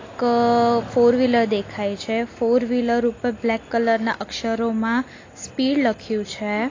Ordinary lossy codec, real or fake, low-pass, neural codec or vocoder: AAC, 32 kbps; real; 7.2 kHz; none